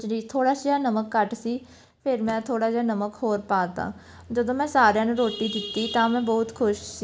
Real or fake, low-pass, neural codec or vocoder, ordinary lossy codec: real; none; none; none